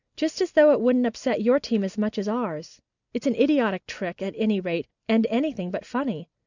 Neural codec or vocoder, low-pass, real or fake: none; 7.2 kHz; real